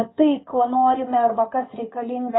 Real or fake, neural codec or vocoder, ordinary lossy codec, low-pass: fake; codec, 16 kHz in and 24 kHz out, 2.2 kbps, FireRedTTS-2 codec; AAC, 16 kbps; 7.2 kHz